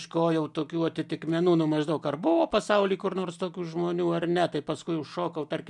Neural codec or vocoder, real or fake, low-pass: none; real; 10.8 kHz